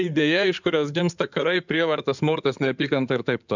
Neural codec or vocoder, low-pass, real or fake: codec, 16 kHz in and 24 kHz out, 2.2 kbps, FireRedTTS-2 codec; 7.2 kHz; fake